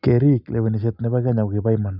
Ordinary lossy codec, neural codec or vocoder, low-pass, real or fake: none; none; 5.4 kHz; real